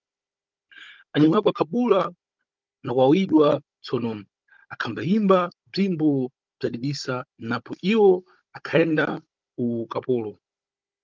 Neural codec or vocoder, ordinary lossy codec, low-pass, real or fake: codec, 16 kHz, 16 kbps, FunCodec, trained on Chinese and English, 50 frames a second; Opus, 24 kbps; 7.2 kHz; fake